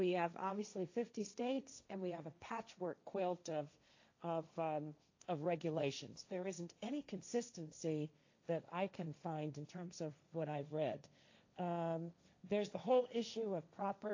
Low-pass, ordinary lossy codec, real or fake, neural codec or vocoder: 7.2 kHz; AAC, 48 kbps; fake; codec, 16 kHz, 1.1 kbps, Voila-Tokenizer